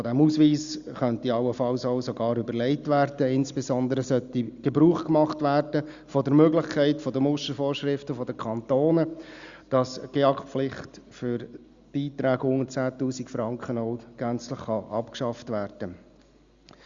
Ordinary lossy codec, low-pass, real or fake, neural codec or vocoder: Opus, 64 kbps; 7.2 kHz; real; none